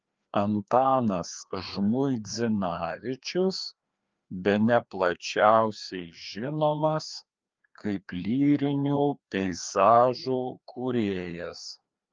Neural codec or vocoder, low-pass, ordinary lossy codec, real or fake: codec, 16 kHz, 2 kbps, FreqCodec, larger model; 7.2 kHz; Opus, 24 kbps; fake